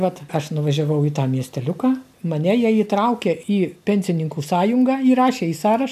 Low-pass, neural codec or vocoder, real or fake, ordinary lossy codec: 14.4 kHz; none; real; MP3, 96 kbps